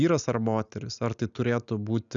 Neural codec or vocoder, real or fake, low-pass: none; real; 7.2 kHz